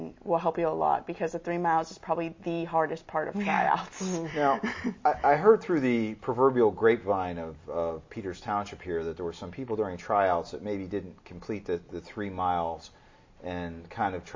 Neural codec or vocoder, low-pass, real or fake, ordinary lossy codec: none; 7.2 kHz; real; MP3, 32 kbps